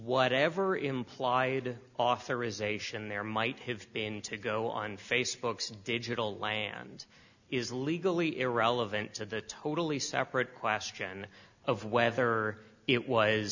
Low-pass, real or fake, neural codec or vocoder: 7.2 kHz; real; none